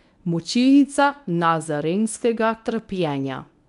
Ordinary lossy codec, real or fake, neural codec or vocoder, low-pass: none; fake; codec, 24 kHz, 0.9 kbps, WavTokenizer, medium speech release version 1; 10.8 kHz